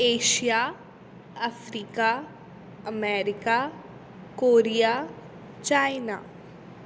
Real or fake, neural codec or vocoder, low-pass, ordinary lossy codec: real; none; none; none